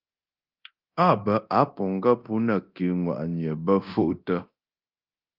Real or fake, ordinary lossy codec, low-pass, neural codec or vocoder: fake; Opus, 24 kbps; 5.4 kHz; codec, 24 kHz, 0.9 kbps, DualCodec